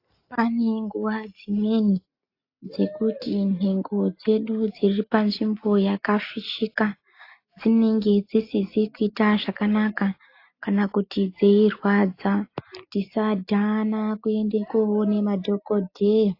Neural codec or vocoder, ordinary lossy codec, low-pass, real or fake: none; AAC, 32 kbps; 5.4 kHz; real